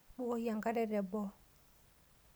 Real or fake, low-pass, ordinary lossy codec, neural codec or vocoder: real; none; none; none